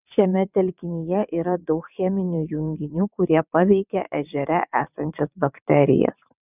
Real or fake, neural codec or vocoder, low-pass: fake; vocoder, 22.05 kHz, 80 mel bands, WaveNeXt; 3.6 kHz